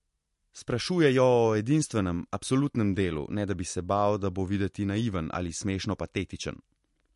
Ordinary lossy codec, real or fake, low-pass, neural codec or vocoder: MP3, 48 kbps; real; 14.4 kHz; none